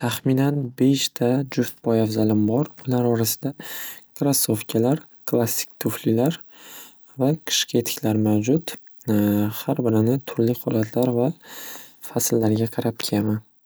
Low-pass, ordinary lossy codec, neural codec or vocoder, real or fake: none; none; none; real